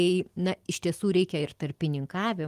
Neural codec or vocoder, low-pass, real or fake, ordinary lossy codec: none; 14.4 kHz; real; Opus, 24 kbps